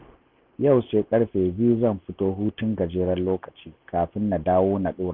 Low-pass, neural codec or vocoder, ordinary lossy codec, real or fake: 5.4 kHz; none; none; real